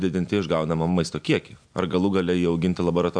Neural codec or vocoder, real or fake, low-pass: none; real; 9.9 kHz